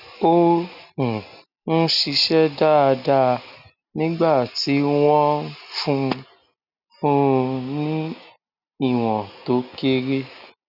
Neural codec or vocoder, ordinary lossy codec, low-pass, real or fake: none; none; 5.4 kHz; real